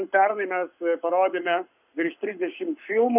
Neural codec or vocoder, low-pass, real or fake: codec, 44.1 kHz, 7.8 kbps, Pupu-Codec; 3.6 kHz; fake